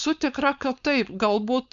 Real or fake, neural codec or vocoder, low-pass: fake; codec, 16 kHz, 4.8 kbps, FACodec; 7.2 kHz